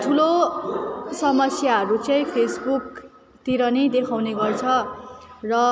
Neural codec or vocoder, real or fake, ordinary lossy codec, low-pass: none; real; none; none